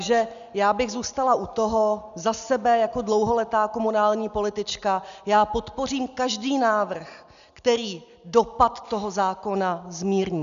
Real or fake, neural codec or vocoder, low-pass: real; none; 7.2 kHz